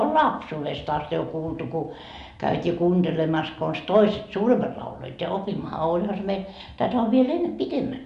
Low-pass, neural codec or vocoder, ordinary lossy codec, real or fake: 10.8 kHz; none; Opus, 64 kbps; real